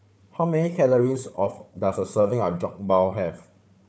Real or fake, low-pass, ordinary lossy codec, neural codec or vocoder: fake; none; none; codec, 16 kHz, 4 kbps, FunCodec, trained on Chinese and English, 50 frames a second